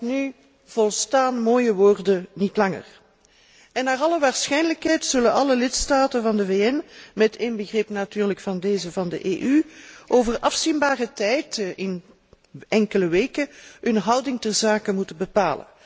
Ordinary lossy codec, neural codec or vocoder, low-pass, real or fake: none; none; none; real